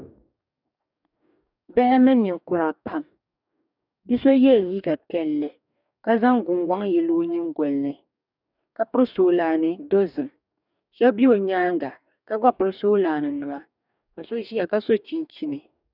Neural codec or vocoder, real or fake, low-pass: codec, 44.1 kHz, 2.6 kbps, DAC; fake; 5.4 kHz